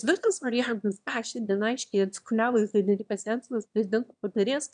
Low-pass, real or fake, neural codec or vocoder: 9.9 kHz; fake; autoencoder, 22.05 kHz, a latent of 192 numbers a frame, VITS, trained on one speaker